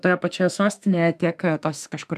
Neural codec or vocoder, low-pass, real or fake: autoencoder, 48 kHz, 32 numbers a frame, DAC-VAE, trained on Japanese speech; 14.4 kHz; fake